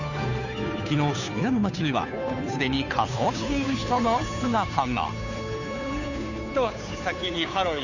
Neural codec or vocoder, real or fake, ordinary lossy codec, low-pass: codec, 16 kHz, 2 kbps, FunCodec, trained on Chinese and English, 25 frames a second; fake; none; 7.2 kHz